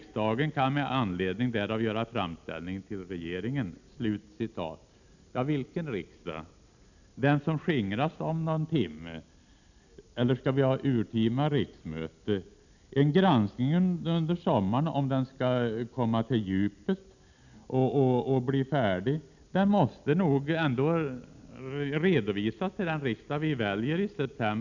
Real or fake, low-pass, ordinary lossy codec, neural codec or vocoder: real; 7.2 kHz; none; none